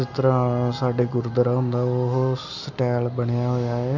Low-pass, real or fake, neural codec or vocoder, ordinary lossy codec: 7.2 kHz; real; none; none